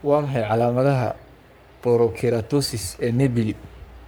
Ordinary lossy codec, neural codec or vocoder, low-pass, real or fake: none; codec, 44.1 kHz, 3.4 kbps, Pupu-Codec; none; fake